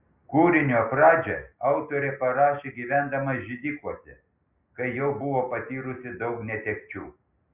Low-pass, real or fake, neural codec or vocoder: 3.6 kHz; real; none